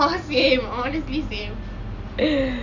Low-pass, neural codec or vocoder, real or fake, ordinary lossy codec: 7.2 kHz; none; real; none